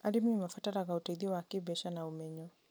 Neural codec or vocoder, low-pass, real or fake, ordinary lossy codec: none; none; real; none